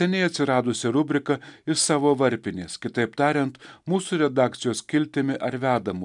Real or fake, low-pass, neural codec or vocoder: real; 10.8 kHz; none